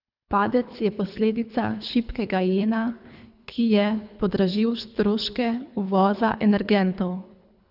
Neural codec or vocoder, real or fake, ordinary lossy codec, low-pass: codec, 24 kHz, 3 kbps, HILCodec; fake; none; 5.4 kHz